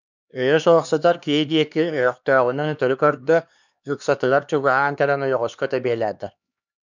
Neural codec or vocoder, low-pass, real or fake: codec, 16 kHz, 2 kbps, X-Codec, HuBERT features, trained on LibriSpeech; 7.2 kHz; fake